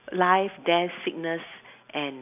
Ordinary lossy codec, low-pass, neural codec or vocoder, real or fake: none; 3.6 kHz; none; real